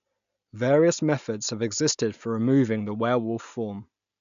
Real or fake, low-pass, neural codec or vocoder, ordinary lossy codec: real; 7.2 kHz; none; none